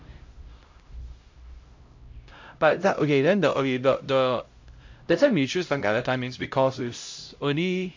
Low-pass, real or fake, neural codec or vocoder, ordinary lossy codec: 7.2 kHz; fake; codec, 16 kHz, 0.5 kbps, X-Codec, HuBERT features, trained on LibriSpeech; MP3, 48 kbps